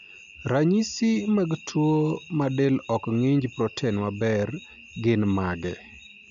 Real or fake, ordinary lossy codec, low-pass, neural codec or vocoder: real; none; 7.2 kHz; none